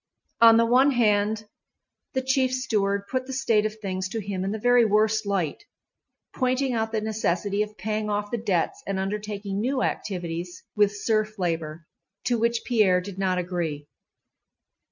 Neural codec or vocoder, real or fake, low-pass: none; real; 7.2 kHz